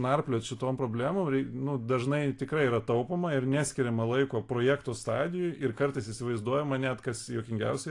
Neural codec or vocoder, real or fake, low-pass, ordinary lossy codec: none; real; 10.8 kHz; AAC, 48 kbps